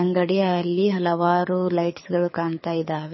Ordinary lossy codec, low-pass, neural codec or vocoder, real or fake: MP3, 24 kbps; 7.2 kHz; codec, 24 kHz, 6 kbps, HILCodec; fake